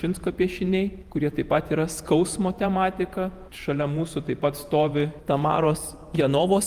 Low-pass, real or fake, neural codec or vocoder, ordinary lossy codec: 14.4 kHz; fake; vocoder, 48 kHz, 128 mel bands, Vocos; Opus, 32 kbps